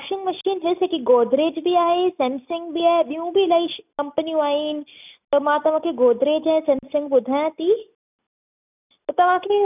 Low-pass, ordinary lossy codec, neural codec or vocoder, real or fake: 3.6 kHz; none; none; real